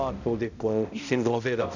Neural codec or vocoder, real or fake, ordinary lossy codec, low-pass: codec, 16 kHz, 0.5 kbps, X-Codec, HuBERT features, trained on balanced general audio; fake; none; 7.2 kHz